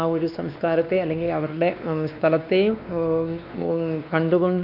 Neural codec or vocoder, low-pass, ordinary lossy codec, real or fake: codec, 16 kHz, 2 kbps, X-Codec, WavLM features, trained on Multilingual LibriSpeech; 5.4 kHz; none; fake